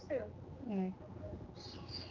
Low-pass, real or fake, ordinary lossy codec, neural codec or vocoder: 7.2 kHz; fake; Opus, 24 kbps; codec, 16 kHz, 2 kbps, X-Codec, HuBERT features, trained on general audio